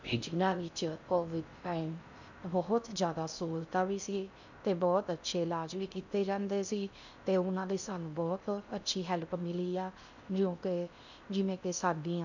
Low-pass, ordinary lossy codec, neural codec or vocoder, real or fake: 7.2 kHz; none; codec, 16 kHz in and 24 kHz out, 0.6 kbps, FocalCodec, streaming, 4096 codes; fake